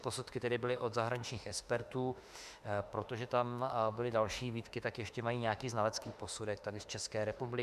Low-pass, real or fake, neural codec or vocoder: 14.4 kHz; fake; autoencoder, 48 kHz, 32 numbers a frame, DAC-VAE, trained on Japanese speech